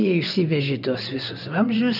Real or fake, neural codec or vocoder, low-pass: fake; vocoder, 24 kHz, 100 mel bands, Vocos; 5.4 kHz